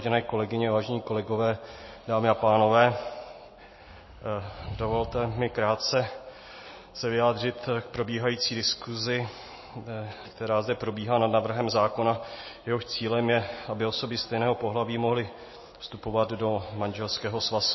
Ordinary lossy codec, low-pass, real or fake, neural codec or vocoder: MP3, 24 kbps; 7.2 kHz; real; none